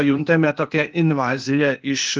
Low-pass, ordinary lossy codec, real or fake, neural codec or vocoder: 7.2 kHz; Opus, 32 kbps; fake; codec, 16 kHz, 0.8 kbps, ZipCodec